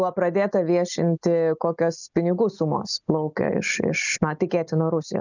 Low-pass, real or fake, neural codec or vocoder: 7.2 kHz; real; none